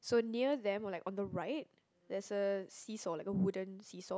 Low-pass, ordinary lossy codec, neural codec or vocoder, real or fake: none; none; none; real